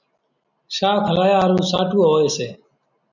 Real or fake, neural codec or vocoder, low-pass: real; none; 7.2 kHz